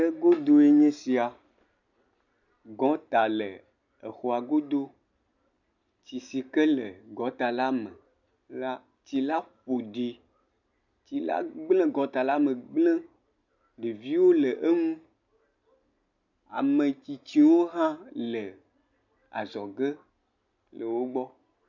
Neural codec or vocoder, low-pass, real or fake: none; 7.2 kHz; real